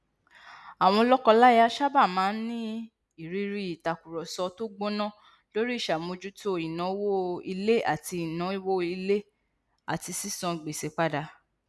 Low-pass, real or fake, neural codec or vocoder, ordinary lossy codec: none; real; none; none